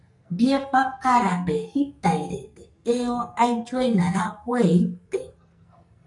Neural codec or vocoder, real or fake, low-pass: codec, 44.1 kHz, 2.6 kbps, SNAC; fake; 10.8 kHz